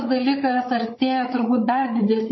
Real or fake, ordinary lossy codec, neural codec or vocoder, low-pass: fake; MP3, 24 kbps; codec, 16 kHz, 8 kbps, FreqCodec, larger model; 7.2 kHz